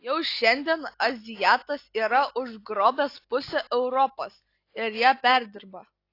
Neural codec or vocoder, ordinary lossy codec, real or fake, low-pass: none; AAC, 32 kbps; real; 5.4 kHz